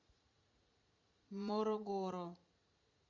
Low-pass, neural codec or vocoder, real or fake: 7.2 kHz; vocoder, 44.1 kHz, 80 mel bands, Vocos; fake